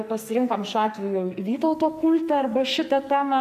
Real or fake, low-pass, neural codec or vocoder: fake; 14.4 kHz; codec, 44.1 kHz, 2.6 kbps, SNAC